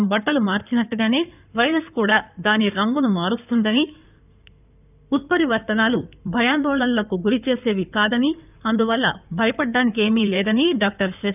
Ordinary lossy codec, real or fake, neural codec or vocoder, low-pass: none; fake; codec, 16 kHz in and 24 kHz out, 2.2 kbps, FireRedTTS-2 codec; 3.6 kHz